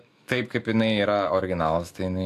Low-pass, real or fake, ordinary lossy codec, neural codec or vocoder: 14.4 kHz; fake; AAC, 64 kbps; autoencoder, 48 kHz, 128 numbers a frame, DAC-VAE, trained on Japanese speech